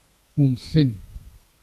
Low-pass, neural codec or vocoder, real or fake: 14.4 kHz; codec, 32 kHz, 1.9 kbps, SNAC; fake